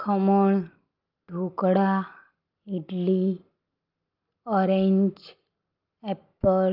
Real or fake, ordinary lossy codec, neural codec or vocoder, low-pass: real; Opus, 32 kbps; none; 5.4 kHz